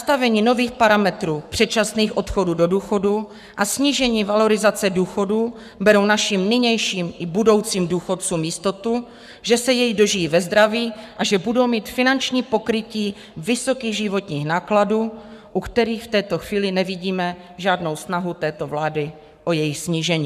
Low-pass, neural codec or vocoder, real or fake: 14.4 kHz; codec, 44.1 kHz, 7.8 kbps, Pupu-Codec; fake